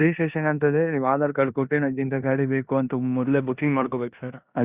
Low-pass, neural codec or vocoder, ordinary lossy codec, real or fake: 3.6 kHz; codec, 16 kHz in and 24 kHz out, 0.9 kbps, LongCat-Audio-Codec, four codebook decoder; Opus, 64 kbps; fake